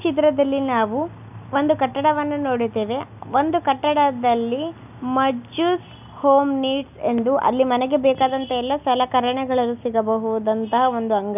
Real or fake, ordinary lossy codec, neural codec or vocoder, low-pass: real; none; none; 3.6 kHz